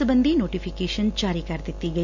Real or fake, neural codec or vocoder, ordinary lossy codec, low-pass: real; none; none; 7.2 kHz